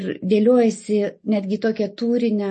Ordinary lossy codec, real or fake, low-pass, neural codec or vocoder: MP3, 32 kbps; real; 10.8 kHz; none